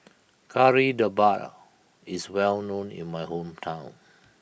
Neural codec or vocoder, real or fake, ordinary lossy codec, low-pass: none; real; none; none